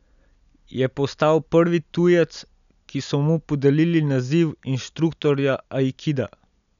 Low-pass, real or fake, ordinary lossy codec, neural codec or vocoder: 7.2 kHz; real; none; none